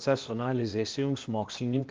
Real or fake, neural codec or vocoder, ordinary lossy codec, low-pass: fake; codec, 16 kHz, 0.8 kbps, ZipCodec; Opus, 24 kbps; 7.2 kHz